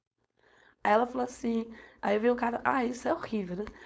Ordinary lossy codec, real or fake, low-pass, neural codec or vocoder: none; fake; none; codec, 16 kHz, 4.8 kbps, FACodec